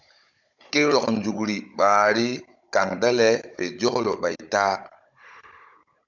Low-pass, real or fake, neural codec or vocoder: 7.2 kHz; fake; codec, 16 kHz, 16 kbps, FunCodec, trained on Chinese and English, 50 frames a second